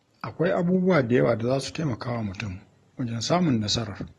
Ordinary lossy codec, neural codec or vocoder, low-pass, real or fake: AAC, 32 kbps; none; 19.8 kHz; real